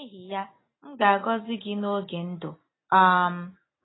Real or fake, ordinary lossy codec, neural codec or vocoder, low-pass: real; AAC, 16 kbps; none; 7.2 kHz